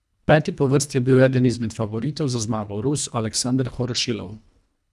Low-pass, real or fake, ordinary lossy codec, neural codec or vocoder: none; fake; none; codec, 24 kHz, 1.5 kbps, HILCodec